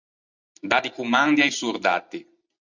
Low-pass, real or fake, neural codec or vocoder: 7.2 kHz; real; none